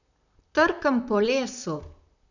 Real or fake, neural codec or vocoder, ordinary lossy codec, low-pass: fake; vocoder, 44.1 kHz, 128 mel bands, Pupu-Vocoder; none; 7.2 kHz